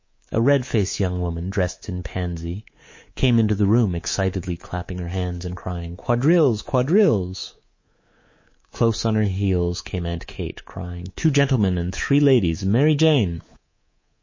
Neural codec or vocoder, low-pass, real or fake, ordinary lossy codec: codec, 24 kHz, 3.1 kbps, DualCodec; 7.2 kHz; fake; MP3, 32 kbps